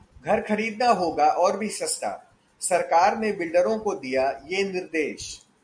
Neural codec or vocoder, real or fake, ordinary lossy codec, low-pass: none; real; MP3, 64 kbps; 9.9 kHz